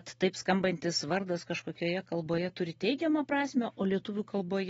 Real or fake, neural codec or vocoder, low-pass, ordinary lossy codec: real; none; 19.8 kHz; AAC, 24 kbps